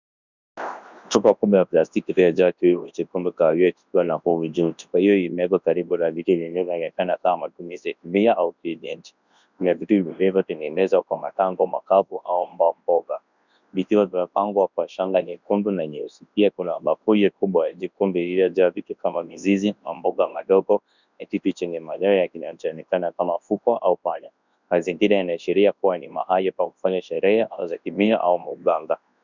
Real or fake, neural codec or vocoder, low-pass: fake; codec, 24 kHz, 0.9 kbps, WavTokenizer, large speech release; 7.2 kHz